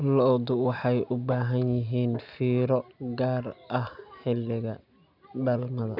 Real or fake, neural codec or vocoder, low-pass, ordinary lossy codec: real; none; 5.4 kHz; none